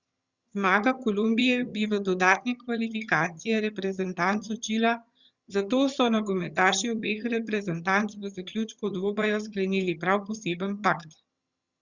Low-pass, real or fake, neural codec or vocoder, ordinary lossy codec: 7.2 kHz; fake; vocoder, 22.05 kHz, 80 mel bands, HiFi-GAN; Opus, 64 kbps